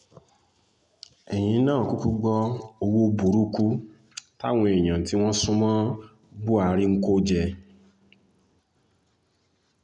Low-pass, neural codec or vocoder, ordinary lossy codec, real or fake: 10.8 kHz; none; none; real